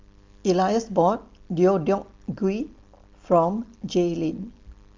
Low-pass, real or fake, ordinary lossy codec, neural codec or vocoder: 7.2 kHz; real; Opus, 32 kbps; none